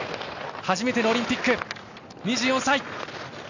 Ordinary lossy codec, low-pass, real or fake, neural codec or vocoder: none; 7.2 kHz; real; none